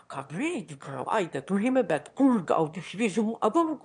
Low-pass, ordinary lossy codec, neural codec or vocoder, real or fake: 9.9 kHz; MP3, 96 kbps; autoencoder, 22.05 kHz, a latent of 192 numbers a frame, VITS, trained on one speaker; fake